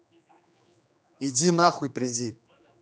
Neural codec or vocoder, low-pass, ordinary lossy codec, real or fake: codec, 16 kHz, 2 kbps, X-Codec, HuBERT features, trained on general audio; none; none; fake